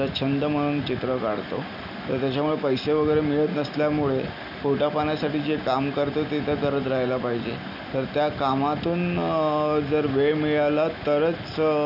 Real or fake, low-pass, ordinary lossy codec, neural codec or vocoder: real; 5.4 kHz; none; none